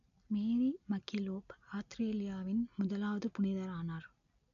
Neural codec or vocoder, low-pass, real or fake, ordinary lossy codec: none; 7.2 kHz; real; none